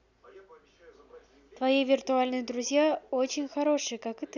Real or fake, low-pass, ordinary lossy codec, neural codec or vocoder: real; 7.2 kHz; none; none